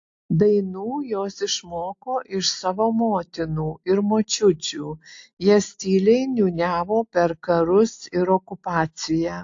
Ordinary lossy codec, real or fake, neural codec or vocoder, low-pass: AAC, 48 kbps; real; none; 7.2 kHz